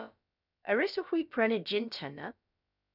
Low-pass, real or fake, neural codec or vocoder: 5.4 kHz; fake; codec, 16 kHz, about 1 kbps, DyCAST, with the encoder's durations